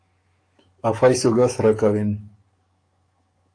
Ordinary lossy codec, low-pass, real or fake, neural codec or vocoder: AAC, 48 kbps; 9.9 kHz; fake; codec, 44.1 kHz, 7.8 kbps, DAC